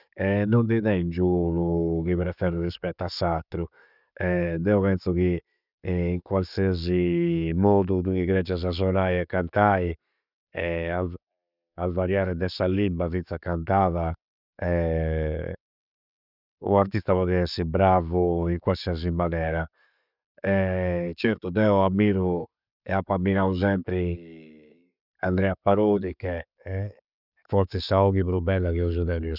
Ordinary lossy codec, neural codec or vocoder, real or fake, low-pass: none; none; real; 5.4 kHz